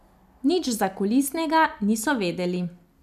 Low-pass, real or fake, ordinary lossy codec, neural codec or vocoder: 14.4 kHz; real; none; none